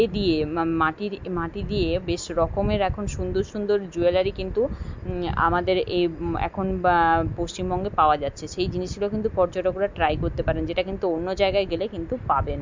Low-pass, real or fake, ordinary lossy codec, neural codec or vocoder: 7.2 kHz; real; MP3, 64 kbps; none